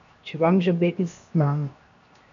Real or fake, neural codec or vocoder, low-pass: fake; codec, 16 kHz, 0.7 kbps, FocalCodec; 7.2 kHz